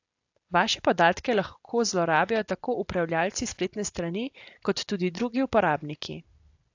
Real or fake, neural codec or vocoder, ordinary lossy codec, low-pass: real; none; AAC, 48 kbps; 7.2 kHz